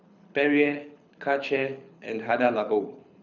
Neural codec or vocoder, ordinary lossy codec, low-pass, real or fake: codec, 24 kHz, 6 kbps, HILCodec; none; 7.2 kHz; fake